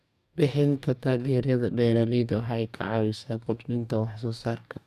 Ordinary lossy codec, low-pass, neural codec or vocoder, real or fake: none; 14.4 kHz; codec, 44.1 kHz, 2.6 kbps, DAC; fake